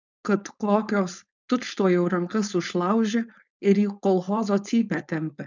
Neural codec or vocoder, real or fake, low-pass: codec, 16 kHz, 4.8 kbps, FACodec; fake; 7.2 kHz